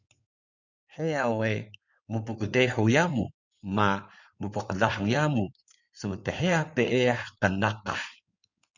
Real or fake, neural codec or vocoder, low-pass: fake; codec, 16 kHz in and 24 kHz out, 2.2 kbps, FireRedTTS-2 codec; 7.2 kHz